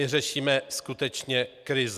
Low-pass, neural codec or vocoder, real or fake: 14.4 kHz; none; real